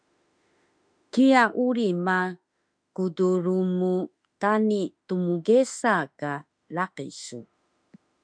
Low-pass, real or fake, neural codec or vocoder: 9.9 kHz; fake; autoencoder, 48 kHz, 32 numbers a frame, DAC-VAE, trained on Japanese speech